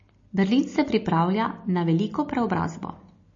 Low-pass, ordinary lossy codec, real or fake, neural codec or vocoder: 7.2 kHz; MP3, 32 kbps; real; none